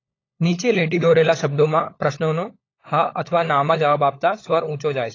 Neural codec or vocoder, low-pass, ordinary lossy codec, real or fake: codec, 16 kHz, 16 kbps, FunCodec, trained on LibriTTS, 50 frames a second; 7.2 kHz; AAC, 32 kbps; fake